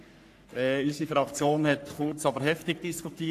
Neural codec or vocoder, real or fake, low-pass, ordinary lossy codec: codec, 44.1 kHz, 3.4 kbps, Pupu-Codec; fake; 14.4 kHz; AAC, 64 kbps